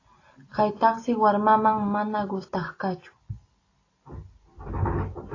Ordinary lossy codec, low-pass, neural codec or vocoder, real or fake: AAC, 32 kbps; 7.2 kHz; none; real